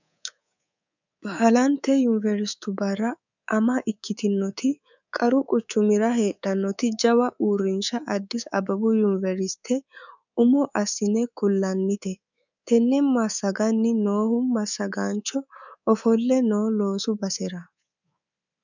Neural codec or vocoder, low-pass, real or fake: codec, 24 kHz, 3.1 kbps, DualCodec; 7.2 kHz; fake